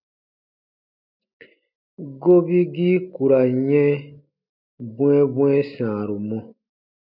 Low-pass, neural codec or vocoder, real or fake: 5.4 kHz; none; real